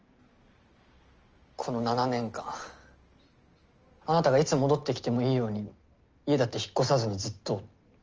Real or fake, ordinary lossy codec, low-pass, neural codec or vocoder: real; Opus, 24 kbps; 7.2 kHz; none